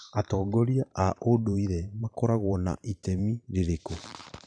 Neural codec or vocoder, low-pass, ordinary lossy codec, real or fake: vocoder, 44.1 kHz, 128 mel bands every 512 samples, BigVGAN v2; 9.9 kHz; none; fake